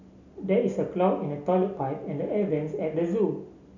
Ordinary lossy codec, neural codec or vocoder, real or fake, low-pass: none; none; real; 7.2 kHz